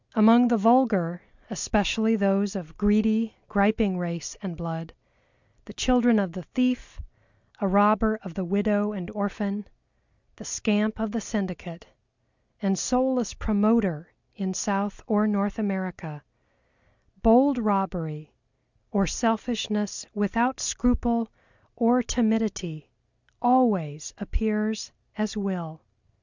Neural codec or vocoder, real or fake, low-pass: none; real; 7.2 kHz